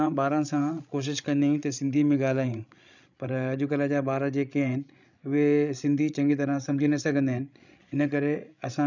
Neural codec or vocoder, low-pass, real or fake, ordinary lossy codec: codec, 16 kHz, 16 kbps, FreqCodec, larger model; 7.2 kHz; fake; none